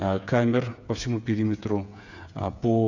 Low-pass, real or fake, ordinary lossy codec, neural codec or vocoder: 7.2 kHz; fake; none; codec, 16 kHz, 8 kbps, FreqCodec, smaller model